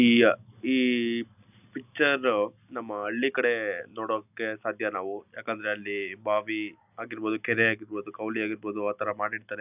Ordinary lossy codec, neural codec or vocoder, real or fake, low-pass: none; none; real; 3.6 kHz